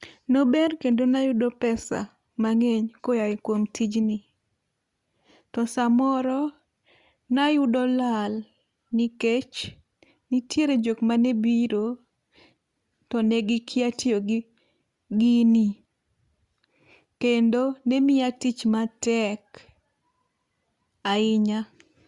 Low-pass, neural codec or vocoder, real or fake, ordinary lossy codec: 10.8 kHz; none; real; Opus, 64 kbps